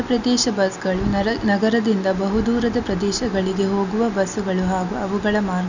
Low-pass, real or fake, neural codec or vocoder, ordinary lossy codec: 7.2 kHz; real; none; none